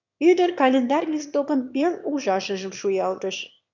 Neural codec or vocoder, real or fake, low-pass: autoencoder, 22.05 kHz, a latent of 192 numbers a frame, VITS, trained on one speaker; fake; 7.2 kHz